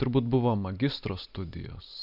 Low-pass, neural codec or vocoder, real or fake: 5.4 kHz; none; real